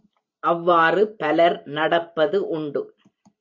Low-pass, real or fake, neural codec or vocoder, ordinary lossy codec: 7.2 kHz; real; none; AAC, 48 kbps